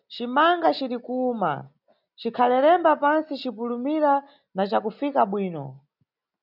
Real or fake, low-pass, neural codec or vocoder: real; 5.4 kHz; none